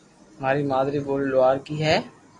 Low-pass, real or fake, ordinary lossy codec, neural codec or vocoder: 10.8 kHz; real; AAC, 32 kbps; none